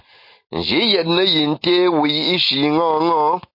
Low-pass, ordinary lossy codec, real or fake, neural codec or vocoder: 5.4 kHz; MP3, 32 kbps; real; none